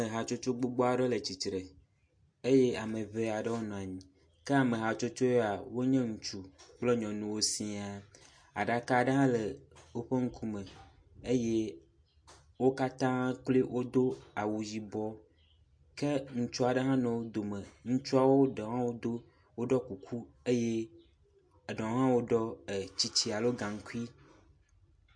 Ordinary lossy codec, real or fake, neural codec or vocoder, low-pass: MP3, 48 kbps; real; none; 9.9 kHz